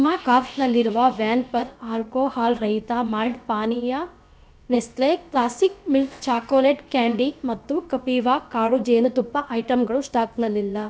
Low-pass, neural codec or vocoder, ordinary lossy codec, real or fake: none; codec, 16 kHz, about 1 kbps, DyCAST, with the encoder's durations; none; fake